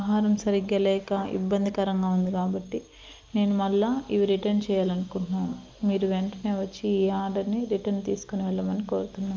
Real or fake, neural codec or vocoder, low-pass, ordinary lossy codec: real; none; 7.2 kHz; Opus, 24 kbps